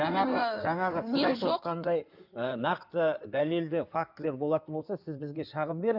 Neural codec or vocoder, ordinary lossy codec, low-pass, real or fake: codec, 16 kHz in and 24 kHz out, 2.2 kbps, FireRedTTS-2 codec; none; 5.4 kHz; fake